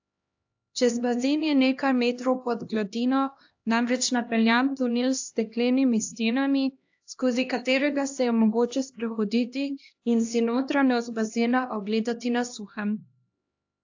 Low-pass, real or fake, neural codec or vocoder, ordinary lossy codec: 7.2 kHz; fake; codec, 16 kHz, 1 kbps, X-Codec, HuBERT features, trained on LibriSpeech; AAC, 48 kbps